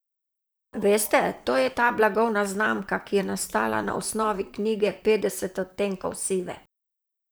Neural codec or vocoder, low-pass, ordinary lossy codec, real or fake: vocoder, 44.1 kHz, 128 mel bands, Pupu-Vocoder; none; none; fake